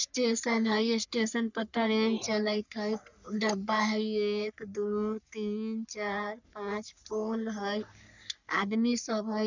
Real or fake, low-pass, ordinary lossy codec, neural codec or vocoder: fake; 7.2 kHz; none; codec, 44.1 kHz, 3.4 kbps, Pupu-Codec